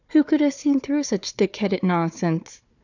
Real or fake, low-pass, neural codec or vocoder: fake; 7.2 kHz; codec, 16 kHz, 4 kbps, FunCodec, trained on Chinese and English, 50 frames a second